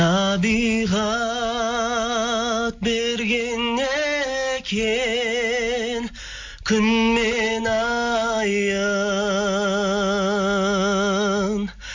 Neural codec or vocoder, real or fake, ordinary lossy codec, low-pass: none; real; MP3, 64 kbps; 7.2 kHz